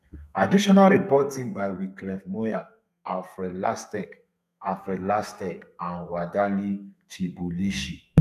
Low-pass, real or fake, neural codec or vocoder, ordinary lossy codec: 14.4 kHz; fake; codec, 32 kHz, 1.9 kbps, SNAC; none